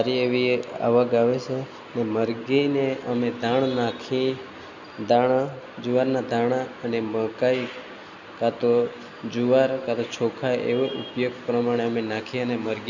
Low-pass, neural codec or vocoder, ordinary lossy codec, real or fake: 7.2 kHz; none; none; real